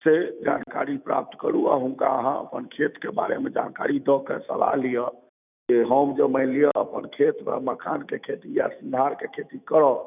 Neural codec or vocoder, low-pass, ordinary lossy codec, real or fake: autoencoder, 48 kHz, 128 numbers a frame, DAC-VAE, trained on Japanese speech; 3.6 kHz; none; fake